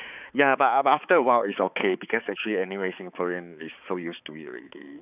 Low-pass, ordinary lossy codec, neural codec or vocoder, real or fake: 3.6 kHz; none; codec, 16 kHz, 4 kbps, X-Codec, HuBERT features, trained on balanced general audio; fake